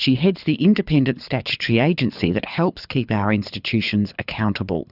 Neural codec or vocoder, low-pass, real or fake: codec, 24 kHz, 6 kbps, HILCodec; 5.4 kHz; fake